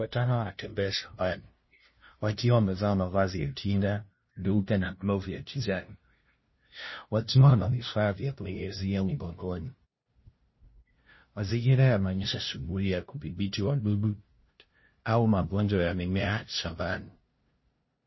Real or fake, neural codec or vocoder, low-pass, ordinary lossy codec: fake; codec, 16 kHz, 0.5 kbps, FunCodec, trained on LibriTTS, 25 frames a second; 7.2 kHz; MP3, 24 kbps